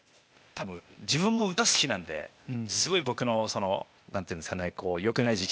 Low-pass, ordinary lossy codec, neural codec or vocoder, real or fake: none; none; codec, 16 kHz, 0.8 kbps, ZipCodec; fake